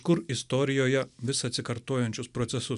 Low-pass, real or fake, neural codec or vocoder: 10.8 kHz; real; none